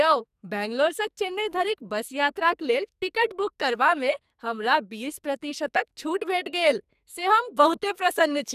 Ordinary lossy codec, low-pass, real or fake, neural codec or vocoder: none; 14.4 kHz; fake; codec, 44.1 kHz, 2.6 kbps, SNAC